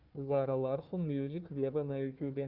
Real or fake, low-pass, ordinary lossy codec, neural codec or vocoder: fake; 5.4 kHz; Opus, 32 kbps; codec, 16 kHz, 1 kbps, FunCodec, trained on Chinese and English, 50 frames a second